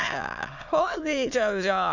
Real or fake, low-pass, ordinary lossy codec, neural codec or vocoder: fake; 7.2 kHz; none; autoencoder, 22.05 kHz, a latent of 192 numbers a frame, VITS, trained on many speakers